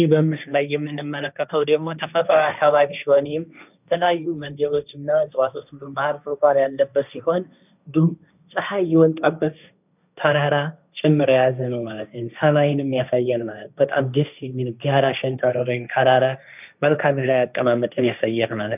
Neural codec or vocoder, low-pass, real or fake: codec, 16 kHz, 1.1 kbps, Voila-Tokenizer; 3.6 kHz; fake